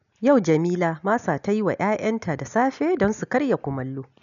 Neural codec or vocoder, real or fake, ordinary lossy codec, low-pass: none; real; none; 7.2 kHz